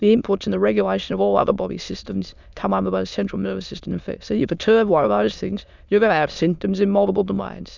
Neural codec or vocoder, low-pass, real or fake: autoencoder, 22.05 kHz, a latent of 192 numbers a frame, VITS, trained on many speakers; 7.2 kHz; fake